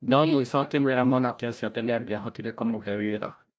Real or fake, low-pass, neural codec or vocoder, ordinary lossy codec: fake; none; codec, 16 kHz, 0.5 kbps, FreqCodec, larger model; none